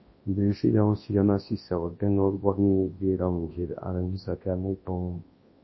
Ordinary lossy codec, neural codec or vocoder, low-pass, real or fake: MP3, 24 kbps; codec, 24 kHz, 0.9 kbps, WavTokenizer, large speech release; 7.2 kHz; fake